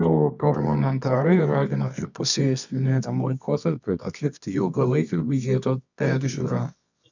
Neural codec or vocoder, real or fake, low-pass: codec, 24 kHz, 0.9 kbps, WavTokenizer, medium music audio release; fake; 7.2 kHz